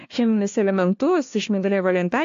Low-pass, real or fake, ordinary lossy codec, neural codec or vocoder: 7.2 kHz; fake; AAC, 48 kbps; codec, 16 kHz, 1 kbps, FunCodec, trained on LibriTTS, 50 frames a second